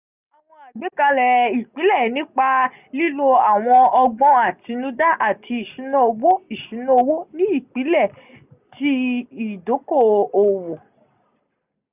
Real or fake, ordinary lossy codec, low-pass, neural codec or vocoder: real; none; 3.6 kHz; none